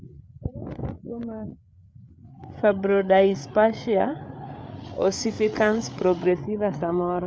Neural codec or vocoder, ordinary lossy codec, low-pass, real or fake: codec, 16 kHz, 8 kbps, FreqCodec, larger model; none; none; fake